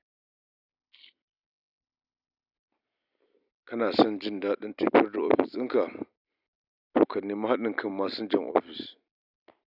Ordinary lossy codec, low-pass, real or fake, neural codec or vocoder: none; 5.4 kHz; real; none